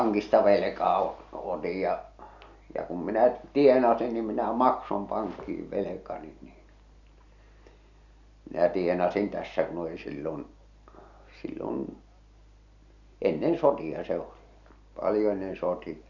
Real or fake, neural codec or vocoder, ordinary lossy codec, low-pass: real; none; none; 7.2 kHz